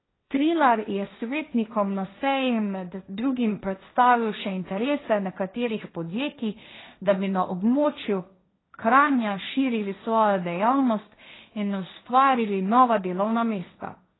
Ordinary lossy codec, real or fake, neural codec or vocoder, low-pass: AAC, 16 kbps; fake; codec, 16 kHz, 1.1 kbps, Voila-Tokenizer; 7.2 kHz